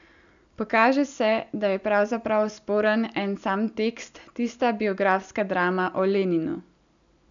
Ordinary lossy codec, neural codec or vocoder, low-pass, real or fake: none; none; 7.2 kHz; real